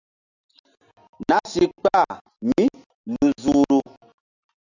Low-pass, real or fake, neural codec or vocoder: 7.2 kHz; real; none